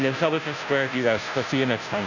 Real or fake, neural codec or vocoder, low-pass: fake; codec, 16 kHz, 0.5 kbps, FunCodec, trained on Chinese and English, 25 frames a second; 7.2 kHz